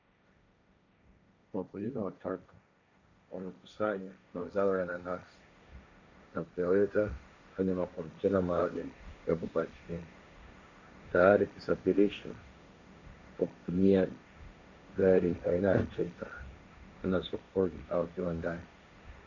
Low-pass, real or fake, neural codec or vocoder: 7.2 kHz; fake; codec, 16 kHz, 1.1 kbps, Voila-Tokenizer